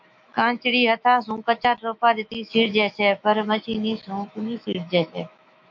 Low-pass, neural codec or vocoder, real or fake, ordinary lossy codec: 7.2 kHz; autoencoder, 48 kHz, 128 numbers a frame, DAC-VAE, trained on Japanese speech; fake; MP3, 64 kbps